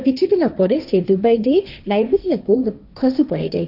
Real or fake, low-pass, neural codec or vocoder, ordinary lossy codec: fake; 5.4 kHz; codec, 16 kHz, 1.1 kbps, Voila-Tokenizer; none